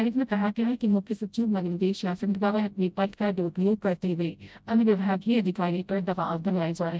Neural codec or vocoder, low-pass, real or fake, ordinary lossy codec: codec, 16 kHz, 0.5 kbps, FreqCodec, smaller model; none; fake; none